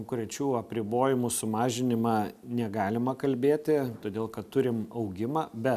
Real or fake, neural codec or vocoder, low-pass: real; none; 14.4 kHz